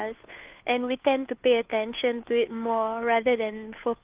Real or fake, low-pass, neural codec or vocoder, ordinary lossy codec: fake; 3.6 kHz; codec, 16 kHz, 2 kbps, FunCodec, trained on Chinese and English, 25 frames a second; Opus, 32 kbps